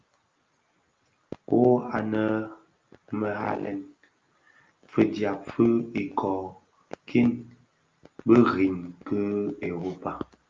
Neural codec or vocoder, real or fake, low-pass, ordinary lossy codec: none; real; 7.2 kHz; Opus, 24 kbps